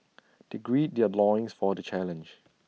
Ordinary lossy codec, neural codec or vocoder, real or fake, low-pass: none; none; real; none